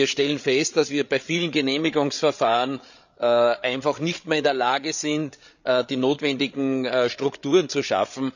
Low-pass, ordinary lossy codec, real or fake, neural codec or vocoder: 7.2 kHz; none; fake; codec, 16 kHz, 8 kbps, FreqCodec, larger model